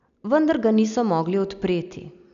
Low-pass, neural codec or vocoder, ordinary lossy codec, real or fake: 7.2 kHz; none; none; real